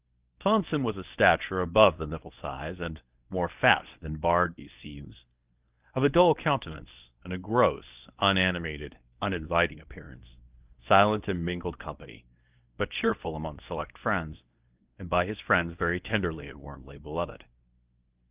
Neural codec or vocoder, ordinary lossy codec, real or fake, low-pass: codec, 24 kHz, 0.9 kbps, WavTokenizer, medium speech release version 1; Opus, 24 kbps; fake; 3.6 kHz